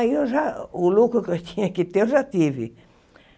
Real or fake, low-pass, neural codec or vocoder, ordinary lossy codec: real; none; none; none